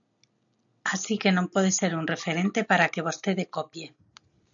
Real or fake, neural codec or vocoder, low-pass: real; none; 7.2 kHz